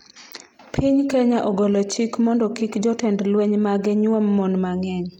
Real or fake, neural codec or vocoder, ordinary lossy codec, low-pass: real; none; none; 19.8 kHz